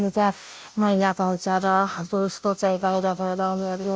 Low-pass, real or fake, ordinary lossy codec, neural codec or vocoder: none; fake; none; codec, 16 kHz, 0.5 kbps, FunCodec, trained on Chinese and English, 25 frames a second